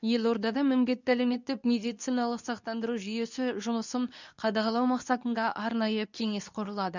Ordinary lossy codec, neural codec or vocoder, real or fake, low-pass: none; codec, 24 kHz, 0.9 kbps, WavTokenizer, medium speech release version 2; fake; 7.2 kHz